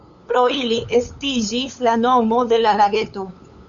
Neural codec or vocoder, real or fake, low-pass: codec, 16 kHz, 8 kbps, FunCodec, trained on LibriTTS, 25 frames a second; fake; 7.2 kHz